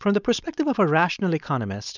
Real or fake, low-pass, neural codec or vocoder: fake; 7.2 kHz; codec, 16 kHz, 4.8 kbps, FACodec